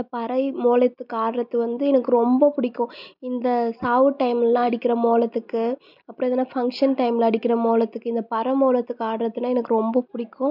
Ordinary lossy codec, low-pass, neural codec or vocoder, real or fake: none; 5.4 kHz; none; real